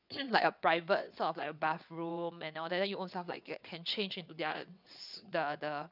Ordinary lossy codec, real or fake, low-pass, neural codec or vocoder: none; fake; 5.4 kHz; vocoder, 22.05 kHz, 80 mel bands, WaveNeXt